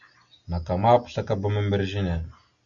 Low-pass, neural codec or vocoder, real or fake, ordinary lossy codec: 7.2 kHz; none; real; Opus, 64 kbps